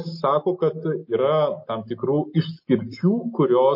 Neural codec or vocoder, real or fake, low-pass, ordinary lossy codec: none; real; 5.4 kHz; MP3, 32 kbps